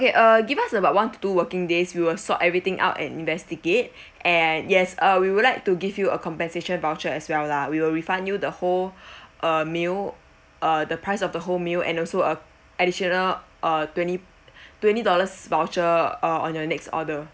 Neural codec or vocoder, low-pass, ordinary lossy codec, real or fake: none; none; none; real